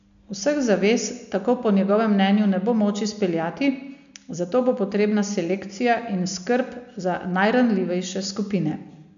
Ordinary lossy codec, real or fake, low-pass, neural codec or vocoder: none; real; 7.2 kHz; none